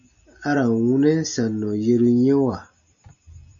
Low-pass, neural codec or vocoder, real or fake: 7.2 kHz; none; real